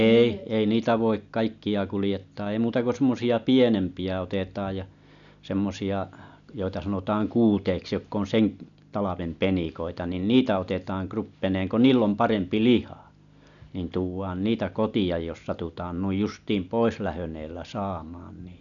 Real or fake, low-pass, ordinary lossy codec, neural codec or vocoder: real; 7.2 kHz; none; none